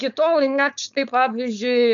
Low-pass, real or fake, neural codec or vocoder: 7.2 kHz; fake; codec, 16 kHz, 4.8 kbps, FACodec